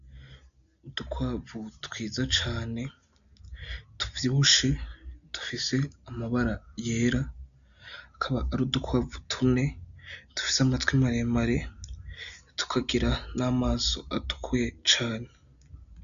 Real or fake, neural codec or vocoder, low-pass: real; none; 7.2 kHz